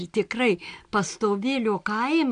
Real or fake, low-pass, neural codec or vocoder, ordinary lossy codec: real; 9.9 kHz; none; AAC, 64 kbps